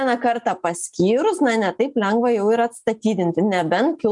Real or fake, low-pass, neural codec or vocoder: real; 10.8 kHz; none